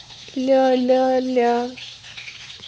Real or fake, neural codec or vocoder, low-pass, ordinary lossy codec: fake; codec, 16 kHz, 4 kbps, X-Codec, WavLM features, trained on Multilingual LibriSpeech; none; none